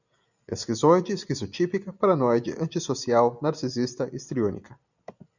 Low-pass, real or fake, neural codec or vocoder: 7.2 kHz; real; none